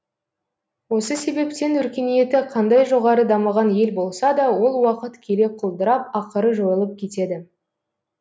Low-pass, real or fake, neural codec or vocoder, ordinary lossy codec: none; real; none; none